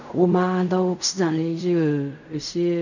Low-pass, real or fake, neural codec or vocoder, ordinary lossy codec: 7.2 kHz; fake; codec, 16 kHz in and 24 kHz out, 0.4 kbps, LongCat-Audio-Codec, fine tuned four codebook decoder; none